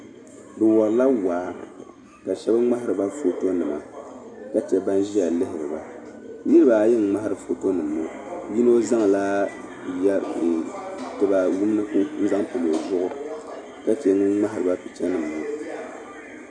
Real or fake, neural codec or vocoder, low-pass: real; none; 9.9 kHz